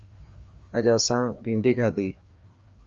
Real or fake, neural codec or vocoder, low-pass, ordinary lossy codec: fake; codec, 16 kHz, 2 kbps, FreqCodec, larger model; 7.2 kHz; Opus, 32 kbps